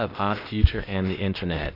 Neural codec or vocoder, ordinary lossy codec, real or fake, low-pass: codec, 16 kHz, 0.8 kbps, ZipCodec; AAC, 32 kbps; fake; 5.4 kHz